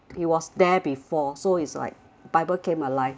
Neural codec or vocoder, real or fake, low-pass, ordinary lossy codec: none; real; none; none